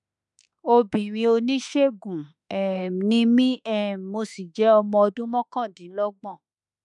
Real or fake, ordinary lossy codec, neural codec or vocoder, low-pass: fake; none; autoencoder, 48 kHz, 32 numbers a frame, DAC-VAE, trained on Japanese speech; 10.8 kHz